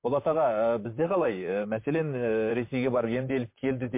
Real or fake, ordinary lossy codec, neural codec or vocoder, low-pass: fake; MP3, 24 kbps; vocoder, 44.1 kHz, 128 mel bands, Pupu-Vocoder; 3.6 kHz